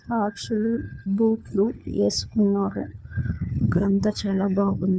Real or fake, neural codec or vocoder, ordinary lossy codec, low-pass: fake; codec, 16 kHz, 4 kbps, FunCodec, trained on LibriTTS, 50 frames a second; none; none